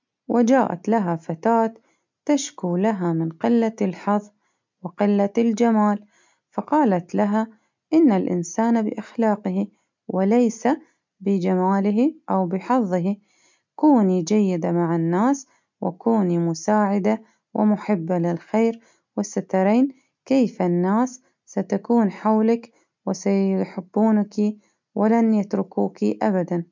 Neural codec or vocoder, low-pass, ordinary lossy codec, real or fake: none; 7.2 kHz; MP3, 64 kbps; real